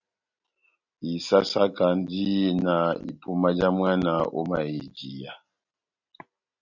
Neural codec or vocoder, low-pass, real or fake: none; 7.2 kHz; real